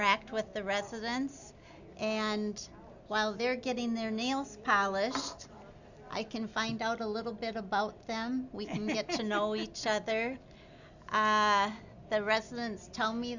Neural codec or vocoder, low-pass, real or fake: none; 7.2 kHz; real